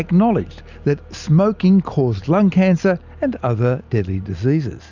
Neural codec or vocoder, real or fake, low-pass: none; real; 7.2 kHz